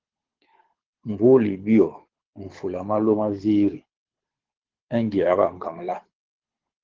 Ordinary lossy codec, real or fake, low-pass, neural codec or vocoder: Opus, 16 kbps; fake; 7.2 kHz; codec, 24 kHz, 6 kbps, HILCodec